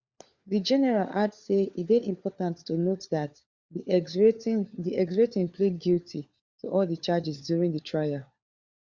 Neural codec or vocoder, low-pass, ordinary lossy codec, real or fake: codec, 16 kHz, 4 kbps, FunCodec, trained on LibriTTS, 50 frames a second; 7.2 kHz; Opus, 64 kbps; fake